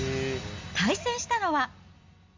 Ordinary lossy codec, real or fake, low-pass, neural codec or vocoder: MP3, 64 kbps; real; 7.2 kHz; none